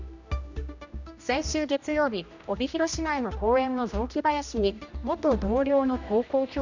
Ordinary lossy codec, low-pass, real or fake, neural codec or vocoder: none; 7.2 kHz; fake; codec, 16 kHz, 1 kbps, X-Codec, HuBERT features, trained on general audio